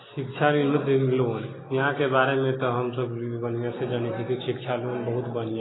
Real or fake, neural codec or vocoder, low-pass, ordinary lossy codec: real; none; 7.2 kHz; AAC, 16 kbps